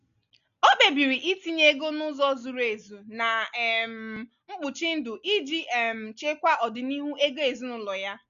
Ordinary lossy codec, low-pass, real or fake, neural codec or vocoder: none; 7.2 kHz; real; none